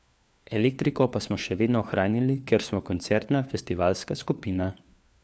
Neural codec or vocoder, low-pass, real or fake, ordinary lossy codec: codec, 16 kHz, 4 kbps, FunCodec, trained on LibriTTS, 50 frames a second; none; fake; none